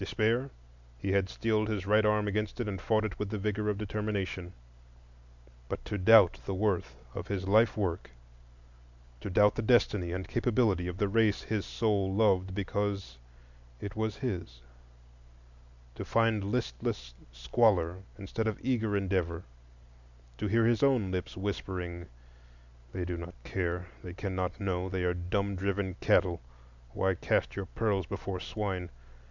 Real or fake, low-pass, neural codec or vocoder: real; 7.2 kHz; none